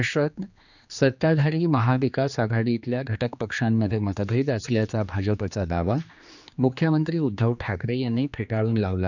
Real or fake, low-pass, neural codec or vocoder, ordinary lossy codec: fake; 7.2 kHz; codec, 16 kHz, 2 kbps, X-Codec, HuBERT features, trained on general audio; none